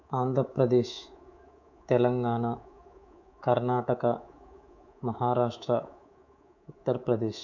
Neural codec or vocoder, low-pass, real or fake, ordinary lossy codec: codec, 24 kHz, 3.1 kbps, DualCodec; 7.2 kHz; fake; MP3, 64 kbps